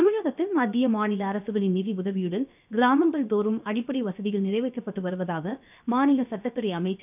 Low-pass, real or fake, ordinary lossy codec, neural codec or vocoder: 3.6 kHz; fake; none; codec, 16 kHz, 0.7 kbps, FocalCodec